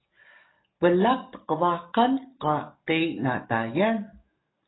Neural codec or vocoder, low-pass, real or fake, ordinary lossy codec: codec, 44.1 kHz, 7.8 kbps, DAC; 7.2 kHz; fake; AAC, 16 kbps